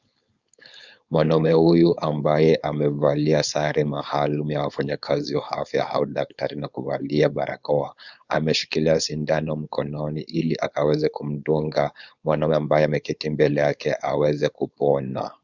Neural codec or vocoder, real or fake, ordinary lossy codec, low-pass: codec, 16 kHz, 4.8 kbps, FACodec; fake; Opus, 64 kbps; 7.2 kHz